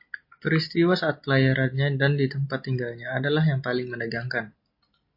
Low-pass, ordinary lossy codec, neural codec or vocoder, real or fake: 5.4 kHz; MP3, 32 kbps; none; real